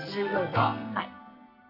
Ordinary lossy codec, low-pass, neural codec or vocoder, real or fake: none; 5.4 kHz; codec, 44.1 kHz, 2.6 kbps, SNAC; fake